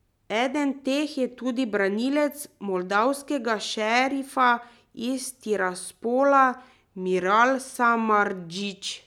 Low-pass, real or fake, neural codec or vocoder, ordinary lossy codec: 19.8 kHz; real; none; none